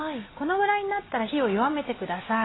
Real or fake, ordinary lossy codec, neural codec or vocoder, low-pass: real; AAC, 16 kbps; none; 7.2 kHz